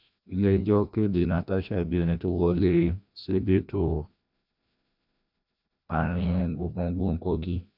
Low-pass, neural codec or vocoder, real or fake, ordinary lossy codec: 5.4 kHz; codec, 16 kHz, 1 kbps, FreqCodec, larger model; fake; none